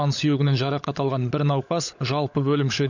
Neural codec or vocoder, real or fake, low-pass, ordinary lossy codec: codec, 16 kHz, 16 kbps, FunCodec, trained on LibriTTS, 50 frames a second; fake; 7.2 kHz; none